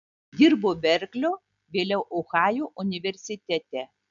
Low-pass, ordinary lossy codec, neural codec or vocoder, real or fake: 7.2 kHz; MP3, 96 kbps; none; real